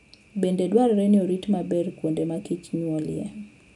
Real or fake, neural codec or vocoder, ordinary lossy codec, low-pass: real; none; none; 10.8 kHz